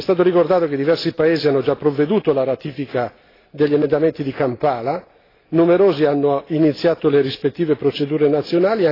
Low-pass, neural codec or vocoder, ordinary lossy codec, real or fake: 5.4 kHz; none; AAC, 24 kbps; real